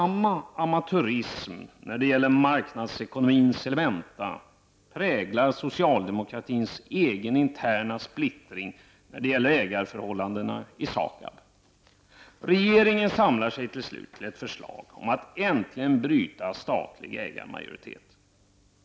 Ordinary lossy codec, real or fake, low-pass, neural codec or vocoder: none; real; none; none